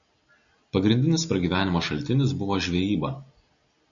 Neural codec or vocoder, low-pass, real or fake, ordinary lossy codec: none; 7.2 kHz; real; AAC, 64 kbps